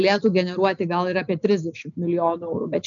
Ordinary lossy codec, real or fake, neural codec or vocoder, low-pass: MP3, 96 kbps; real; none; 7.2 kHz